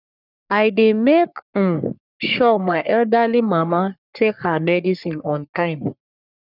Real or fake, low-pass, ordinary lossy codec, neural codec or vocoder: fake; 5.4 kHz; none; codec, 44.1 kHz, 3.4 kbps, Pupu-Codec